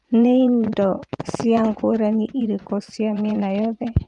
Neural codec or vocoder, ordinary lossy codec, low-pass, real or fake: vocoder, 24 kHz, 100 mel bands, Vocos; Opus, 32 kbps; 10.8 kHz; fake